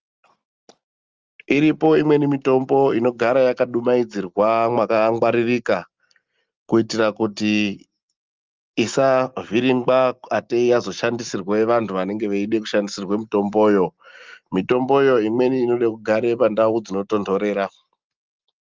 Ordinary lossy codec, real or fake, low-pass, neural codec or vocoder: Opus, 24 kbps; real; 7.2 kHz; none